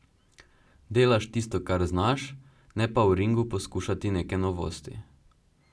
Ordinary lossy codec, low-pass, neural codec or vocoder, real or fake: none; none; none; real